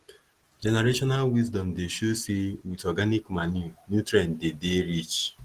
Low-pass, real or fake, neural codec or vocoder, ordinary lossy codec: 14.4 kHz; real; none; Opus, 16 kbps